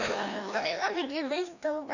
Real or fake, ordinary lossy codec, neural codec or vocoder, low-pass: fake; none; codec, 16 kHz, 1 kbps, FreqCodec, larger model; 7.2 kHz